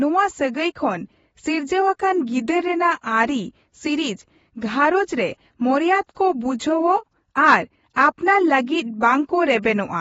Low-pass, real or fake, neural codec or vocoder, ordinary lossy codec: 19.8 kHz; fake; vocoder, 48 kHz, 128 mel bands, Vocos; AAC, 24 kbps